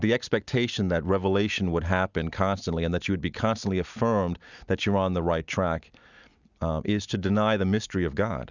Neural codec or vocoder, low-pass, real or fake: none; 7.2 kHz; real